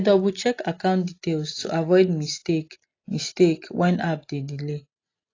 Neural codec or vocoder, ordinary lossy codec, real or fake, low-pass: none; AAC, 32 kbps; real; 7.2 kHz